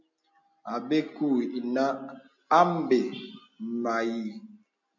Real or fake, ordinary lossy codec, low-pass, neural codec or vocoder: real; MP3, 64 kbps; 7.2 kHz; none